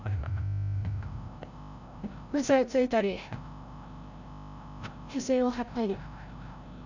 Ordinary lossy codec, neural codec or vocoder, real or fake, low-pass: none; codec, 16 kHz, 0.5 kbps, FreqCodec, larger model; fake; 7.2 kHz